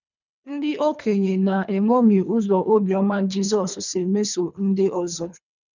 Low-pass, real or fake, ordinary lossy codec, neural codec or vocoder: 7.2 kHz; fake; none; codec, 24 kHz, 3 kbps, HILCodec